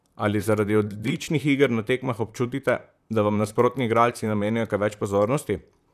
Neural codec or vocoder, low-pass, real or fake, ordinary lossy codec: vocoder, 44.1 kHz, 128 mel bands, Pupu-Vocoder; 14.4 kHz; fake; none